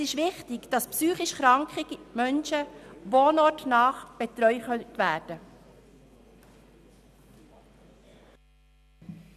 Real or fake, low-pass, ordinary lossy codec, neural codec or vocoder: real; 14.4 kHz; none; none